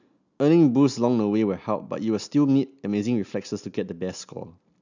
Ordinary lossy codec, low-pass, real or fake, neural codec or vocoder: none; 7.2 kHz; real; none